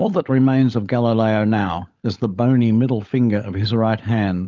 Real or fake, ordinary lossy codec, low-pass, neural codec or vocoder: fake; Opus, 24 kbps; 7.2 kHz; codec, 16 kHz, 16 kbps, FunCodec, trained on LibriTTS, 50 frames a second